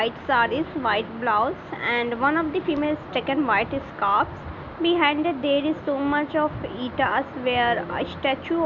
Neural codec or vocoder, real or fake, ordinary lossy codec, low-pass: none; real; none; 7.2 kHz